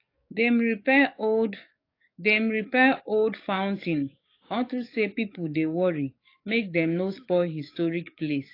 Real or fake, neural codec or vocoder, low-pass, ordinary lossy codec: fake; codec, 44.1 kHz, 7.8 kbps, DAC; 5.4 kHz; AAC, 32 kbps